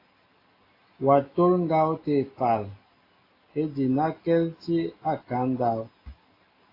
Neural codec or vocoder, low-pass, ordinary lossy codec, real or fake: none; 5.4 kHz; AAC, 24 kbps; real